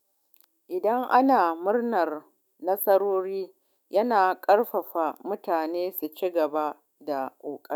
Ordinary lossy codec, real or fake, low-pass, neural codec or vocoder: none; fake; none; autoencoder, 48 kHz, 128 numbers a frame, DAC-VAE, trained on Japanese speech